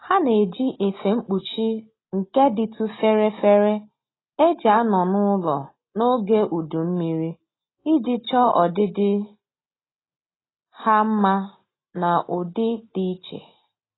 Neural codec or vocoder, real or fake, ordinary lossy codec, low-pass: none; real; AAC, 16 kbps; 7.2 kHz